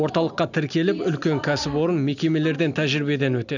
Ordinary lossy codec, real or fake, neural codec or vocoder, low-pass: none; real; none; 7.2 kHz